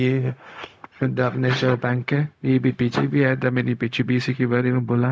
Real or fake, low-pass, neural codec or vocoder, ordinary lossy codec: fake; none; codec, 16 kHz, 0.4 kbps, LongCat-Audio-Codec; none